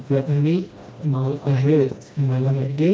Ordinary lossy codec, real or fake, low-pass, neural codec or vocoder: none; fake; none; codec, 16 kHz, 1 kbps, FreqCodec, smaller model